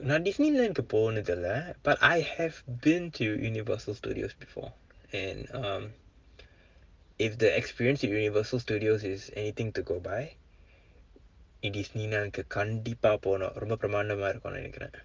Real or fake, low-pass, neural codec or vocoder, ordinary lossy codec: real; 7.2 kHz; none; Opus, 32 kbps